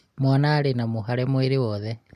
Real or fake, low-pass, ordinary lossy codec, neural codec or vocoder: real; 14.4 kHz; MP3, 64 kbps; none